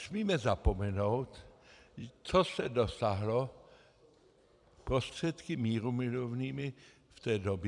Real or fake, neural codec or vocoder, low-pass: fake; vocoder, 44.1 kHz, 128 mel bands every 256 samples, BigVGAN v2; 10.8 kHz